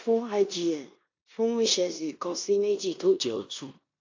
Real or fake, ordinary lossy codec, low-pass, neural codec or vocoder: fake; AAC, 48 kbps; 7.2 kHz; codec, 16 kHz in and 24 kHz out, 0.9 kbps, LongCat-Audio-Codec, four codebook decoder